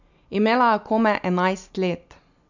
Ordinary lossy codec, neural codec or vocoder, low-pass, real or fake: none; none; 7.2 kHz; real